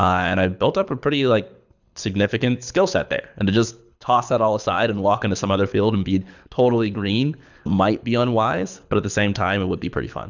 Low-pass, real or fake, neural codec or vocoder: 7.2 kHz; fake; codec, 24 kHz, 6 kbps, HILCodec